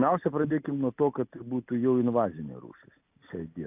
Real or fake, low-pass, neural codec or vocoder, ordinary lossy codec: real; 3.6 kHz; none; AAC, 32 kbps